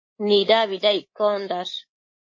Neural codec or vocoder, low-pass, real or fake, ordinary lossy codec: codec, 24 kHz, 3.1 kbps, DualCodec; 7.2 kHz; fake; MP3, 32 kbps